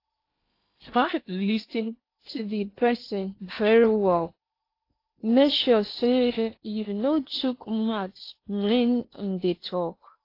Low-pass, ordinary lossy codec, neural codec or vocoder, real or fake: 5.4 kHz; AAC, 32 kbps; codec, 16 kHz in and 24 kHz out, 0.8 kbps, FocalCodec, streaming, 65536 codes; fake